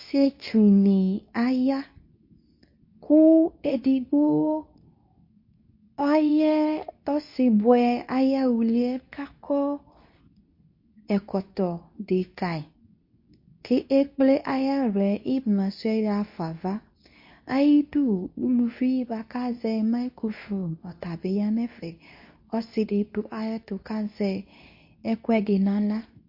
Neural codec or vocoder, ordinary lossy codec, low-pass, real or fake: codec, 24 kHz, 0.9 kbps, WavTokenizer, medium speech release version 2; MP3, 32 kbps; 5.4 kHz; fake